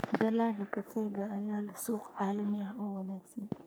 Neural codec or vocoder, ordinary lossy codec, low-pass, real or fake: codec, 44.1 kHz, 3.4 kbps, Pupu-Codec; none; none; fake